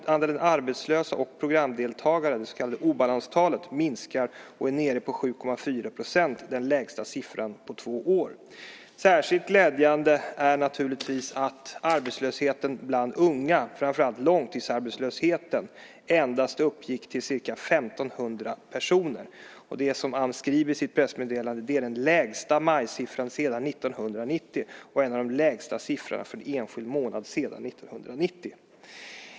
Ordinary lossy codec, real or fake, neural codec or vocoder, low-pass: none; real; none; none